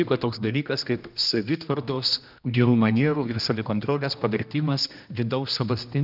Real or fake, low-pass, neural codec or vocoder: fake; 5.4 kHz; codec, 16 kHz, 1 kbps, X-Codec, HuBERT features, trained on general audio